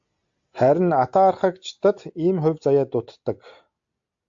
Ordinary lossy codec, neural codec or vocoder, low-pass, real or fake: AAC, 64 kbps; none; 7.2 kHz; real